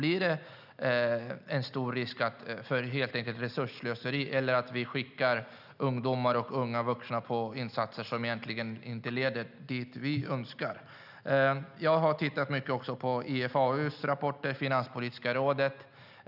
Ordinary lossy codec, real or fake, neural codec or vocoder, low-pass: none; real; none; 5.4 kHz